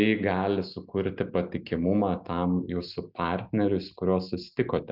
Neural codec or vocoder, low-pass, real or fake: none; 5.4 kHz; real